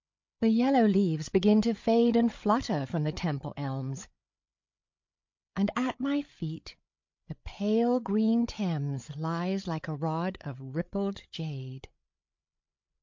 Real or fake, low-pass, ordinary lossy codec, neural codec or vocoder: fake; 7.2 kHz; MP3, 48 kbps; codec, 16 kHz, 8 kbps, FreqCodec, larger model